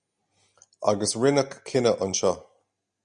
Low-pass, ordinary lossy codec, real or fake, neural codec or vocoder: 9.9 kHz; Opus, 64 kbps; real; none